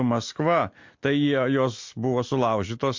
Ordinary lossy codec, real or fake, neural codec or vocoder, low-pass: MP3, 48 kbps; real; none; 7.2 kHz